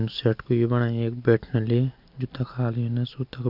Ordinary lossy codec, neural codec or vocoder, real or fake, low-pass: none; none; real; 5.4 kHz